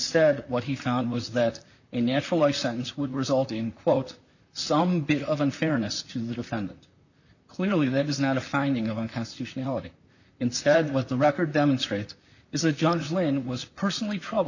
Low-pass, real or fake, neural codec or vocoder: 7.2 kHz; fake; vocoder, 44.1 kHz, 128 mel bands, Pupu-Vocoder